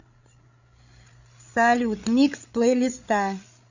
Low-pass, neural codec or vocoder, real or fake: 7.2 kHz; codec, 16 kHz, 8 kbps, FreqCodec, larger model; fake